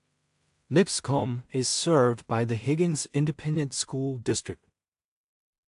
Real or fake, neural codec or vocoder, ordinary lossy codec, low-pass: fake; codec, 16 kHz in and 24 kHz out, 0.4 kbps, LongCat-Audio-Codec, two codebook decoder; AAC, 64 kbps; 10.8 kHz